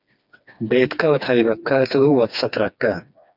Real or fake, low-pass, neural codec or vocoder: fake; 5.4 kHz; codec, 16 kHz, 2 kbps, FreqCodec, smaller model